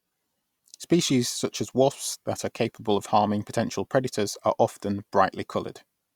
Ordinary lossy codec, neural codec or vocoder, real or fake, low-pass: Opus, 64 kbps; none; real; 19.8 kHz